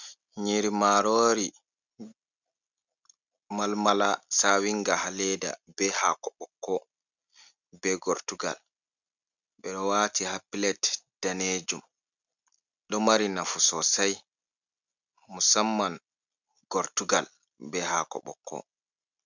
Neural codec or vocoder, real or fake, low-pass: none; real; 7.2 kHz